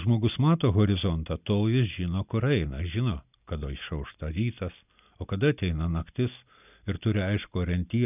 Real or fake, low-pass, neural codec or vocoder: real; 3.6 kHz; none